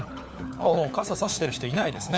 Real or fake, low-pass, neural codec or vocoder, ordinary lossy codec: fake; none; codec, 16 kHz, 4 kbps, FunCodec, trained on LibriTTS, 50 frames a second; none